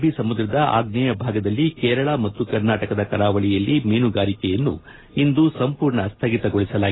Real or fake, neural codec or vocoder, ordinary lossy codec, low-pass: real; none; AAC, 16 kbps; 7.2 kHz